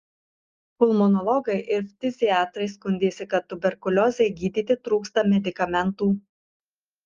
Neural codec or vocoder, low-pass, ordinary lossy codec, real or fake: none; 7.2 kHz; Opus, 32 kbps; real